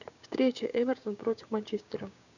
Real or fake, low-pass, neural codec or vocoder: fake; 7.2 kHz; codec, 16 kHz, 6 kbps, DAC